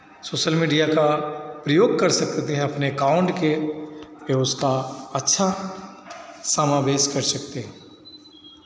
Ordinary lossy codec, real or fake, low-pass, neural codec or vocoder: none; real; none; none